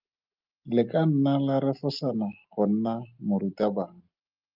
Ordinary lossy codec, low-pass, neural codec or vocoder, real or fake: Opus, 24 kbps; 5.4 kHz; none; real